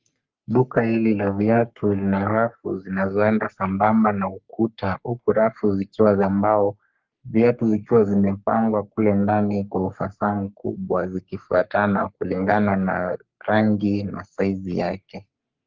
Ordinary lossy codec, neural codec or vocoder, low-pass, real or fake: Opus, 32 kbps; codec, 44.1 kHz, 3.4 kbps, Pupu-Codec; 7.2 kHz; fake